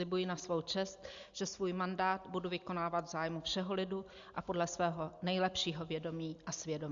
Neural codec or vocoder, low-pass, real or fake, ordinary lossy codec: none; 7.2 kHz; real; Opus, 64 kbps